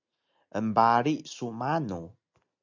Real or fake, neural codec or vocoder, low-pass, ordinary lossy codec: real; none; 7.2 kHz; MP3, 64 kbps